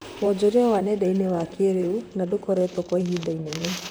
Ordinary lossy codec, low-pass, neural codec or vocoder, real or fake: none; none; vocoder, 44.1 kHz, 128 mel bands, Pupu-Vocoder; fake